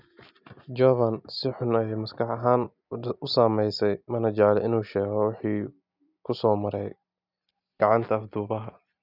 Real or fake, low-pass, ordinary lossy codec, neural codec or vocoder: real; 5.4 kHz; none; none